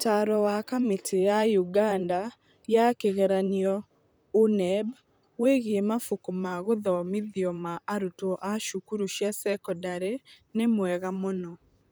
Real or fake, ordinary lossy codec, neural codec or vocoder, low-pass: fake; none; vocoder, 44.1 kHz, 128 mel bands, Pupu-Vocoder; none